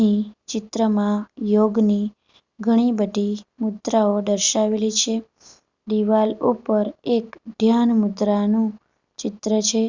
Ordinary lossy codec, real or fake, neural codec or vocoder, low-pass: Opus, 64 kbps; real; none; 7.2 kHz